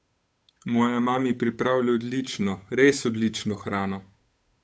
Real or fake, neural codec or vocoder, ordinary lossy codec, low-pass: fake; codec, 16 kHz, 8 kbps, FunCodec, trained on Chinese and English, 25 frames a second; none; none